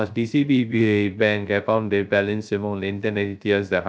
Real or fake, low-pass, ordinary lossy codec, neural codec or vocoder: fake; none; none; codec, 16 kHz, 0.3 kbps, FocalCodec